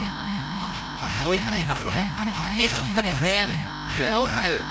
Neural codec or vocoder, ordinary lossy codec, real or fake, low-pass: codec, 16 kHz, 0.5 kbps, FreqCodec, larger model; none; fake; none